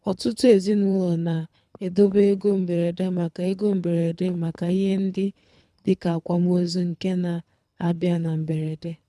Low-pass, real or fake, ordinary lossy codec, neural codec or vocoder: none; fake; none; codec, 24 kHz, 3 kbps, HILCodec